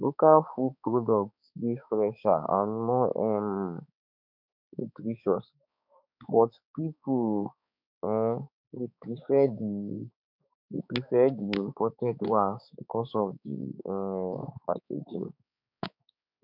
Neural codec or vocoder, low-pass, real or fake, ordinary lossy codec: codec, 16 kHz, 4 kbps, X-Codec, HuBERT features, trained on balanced general audio; 5.4 kHz; fake; none